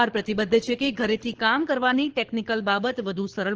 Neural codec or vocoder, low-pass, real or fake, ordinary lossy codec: codec, 24 kHz, 6 kbps, HILCodec; 7.2 kHz; fake; Opus, 16 kbps